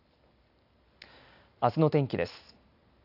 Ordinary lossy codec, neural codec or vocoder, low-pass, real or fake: AAC, 48 kbps; none; 5.4 kHz; real